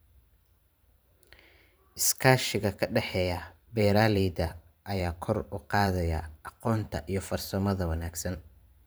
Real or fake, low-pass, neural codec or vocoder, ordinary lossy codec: fake; none; vocoder, 44.1 kHz, 128 mel bands every 512 samples, BigVGAN v2; none